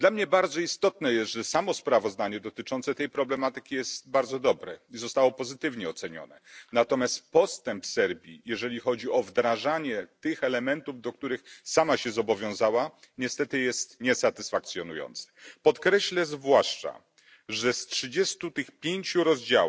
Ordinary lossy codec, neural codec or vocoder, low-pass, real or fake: none; none; none; real